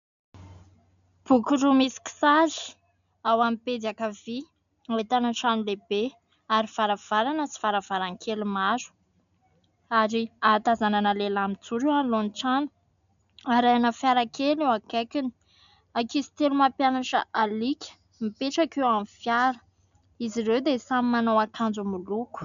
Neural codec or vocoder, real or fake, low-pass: none; real; 7.2 kHz